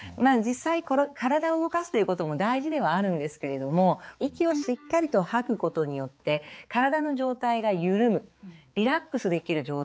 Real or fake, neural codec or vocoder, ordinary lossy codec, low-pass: fake; codec, 16 kHz, 4 kbps, X-Codec, HuBERT features, trained on balanced general audio; none; none